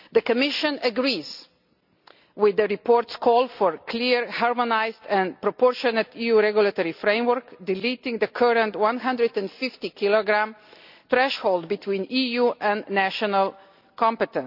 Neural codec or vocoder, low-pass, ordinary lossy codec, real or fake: none; 5.4 kHz; none; real